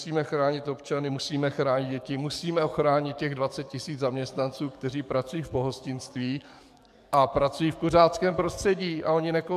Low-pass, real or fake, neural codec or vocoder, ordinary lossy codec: 14.4 kHz; fake; codec, 44.1 kHz, 7.8 kbps, DAC; MP3, 96 kbps